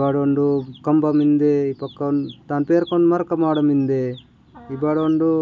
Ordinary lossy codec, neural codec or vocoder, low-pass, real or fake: none; none; none; real